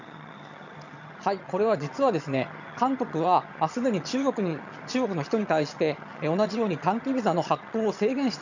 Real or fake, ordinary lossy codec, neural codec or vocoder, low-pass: fake; none; vocoder, 22.05 kHz, 80 mel bands, HiFi-GAN; 7.2 kHz